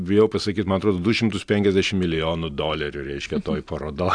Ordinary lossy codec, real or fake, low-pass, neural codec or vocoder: AAC, 64 kbps; real; 9.9 kHz; none